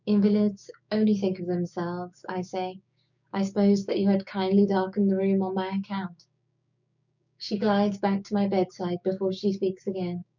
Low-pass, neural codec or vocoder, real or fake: 7.2 kHz; codec, 16 kHz, 6 kbps, DAC; fake